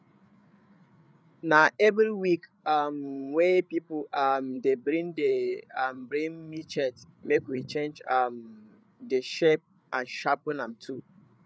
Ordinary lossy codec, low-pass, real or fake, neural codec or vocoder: none; none; fake; codec, 16 kHz, 8 kbps, FreqCodec, larger model